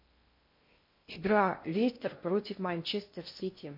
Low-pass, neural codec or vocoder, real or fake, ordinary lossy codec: 5.4 kHz; codec, 16 kHz in and 24 kHz out, 0.6 kbps, FocalCodec, streaming, 2048 codes; fake; MP3, 32 kbps